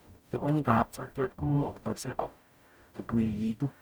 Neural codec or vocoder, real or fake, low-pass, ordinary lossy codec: codec, 44.1 kHz, 0.9 kbps, DAC; fake; none; none